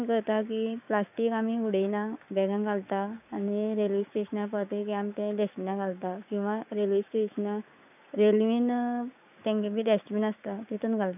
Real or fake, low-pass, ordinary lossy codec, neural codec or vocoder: fake; 3.6 kHz; none; codec, 16 kHz, 6 kbps, DAC